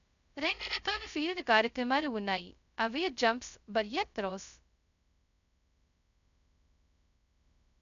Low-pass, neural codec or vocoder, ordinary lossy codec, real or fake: 7.2 kHz; codec, 16 kHz, 0.2 kbps, FocalCodec; none; fake